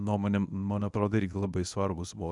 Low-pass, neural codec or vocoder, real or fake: 10.8 kHz; codec, 24 kHz, 0.9 kbps, WavTokenizer, medium speech release version 1; fake